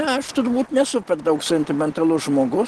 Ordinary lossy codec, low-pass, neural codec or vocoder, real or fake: Opus, 16 kbps; 10.8 kHz; none; real